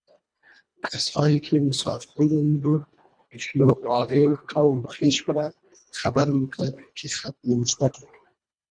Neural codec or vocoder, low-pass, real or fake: codec, 24 kHz, 1.5 kbps, HILCodec; 9.9 kHz; fake